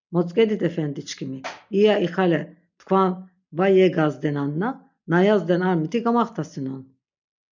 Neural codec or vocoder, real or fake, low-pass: none; real; 7.2 kHz